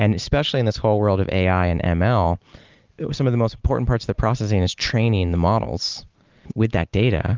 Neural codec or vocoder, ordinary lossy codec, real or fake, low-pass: none; Opus, 24 kbps; real; 7.2 kHz